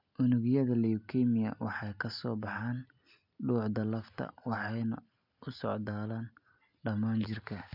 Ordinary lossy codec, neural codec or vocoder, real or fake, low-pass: none; none; real; 5.4 kHz